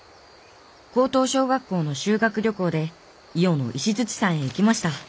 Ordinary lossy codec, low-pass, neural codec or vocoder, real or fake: none; none; none; real